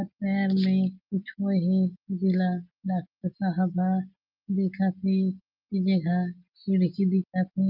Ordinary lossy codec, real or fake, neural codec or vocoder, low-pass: Opus, 24 kbps; real; none; 5.4 kHz